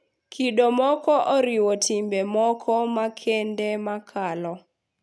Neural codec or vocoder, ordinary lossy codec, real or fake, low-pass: none; none; real; none